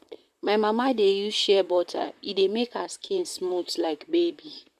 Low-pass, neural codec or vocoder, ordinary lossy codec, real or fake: 14.4 kHz; vocoder, 44.1 kHz, 128 mel bands, Pupu-Vocoder; MP3, 96 kbps; fake